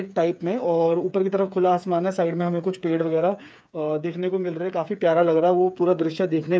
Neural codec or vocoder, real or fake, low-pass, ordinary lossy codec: codec, 16 kHz, 8 kbps, FreqCodec, smaller model; fake; none; none